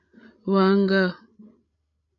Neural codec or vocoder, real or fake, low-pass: codec, 16 kHz, 16 kbps, FreqCodec, larger model; fake; 7.2 kHz